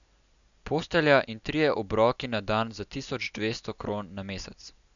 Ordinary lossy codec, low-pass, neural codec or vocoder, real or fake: none; 7.2 kHz; none; real